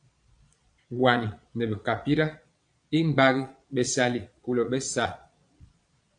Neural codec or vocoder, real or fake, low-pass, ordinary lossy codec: vocoder, 22.05 kHz, 80 mel bands, Vocos; fake; 9.9 kHz; AAC, 64 kbps